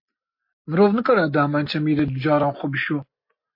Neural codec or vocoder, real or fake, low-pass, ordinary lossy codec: none; real; 5.4 kHz; MP3, 32 kbps